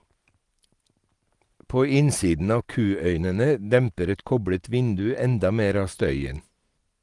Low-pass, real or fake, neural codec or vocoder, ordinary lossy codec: 10.8 kHz; real; none; Opus, 24 kbps